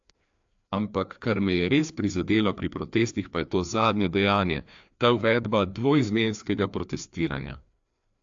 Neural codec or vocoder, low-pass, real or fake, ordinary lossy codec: codec, 16 kHz, 2 kbps, FreqCodec, larger model; 7.2 kHz; fake; AAC, 64 kbps